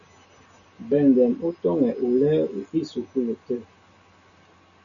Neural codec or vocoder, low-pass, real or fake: none; 7.2 kHz; real